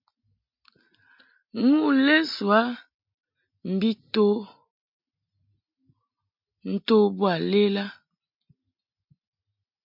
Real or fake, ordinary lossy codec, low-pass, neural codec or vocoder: real; MP3, 48 kbps; 5.4 kHz; none